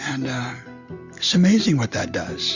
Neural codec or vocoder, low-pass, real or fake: none; 7.2 kHz; real